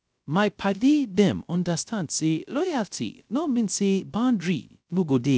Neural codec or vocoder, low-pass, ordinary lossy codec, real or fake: codec, 16 kHz, 0.3 kbps, FocalCodec; none; none; fake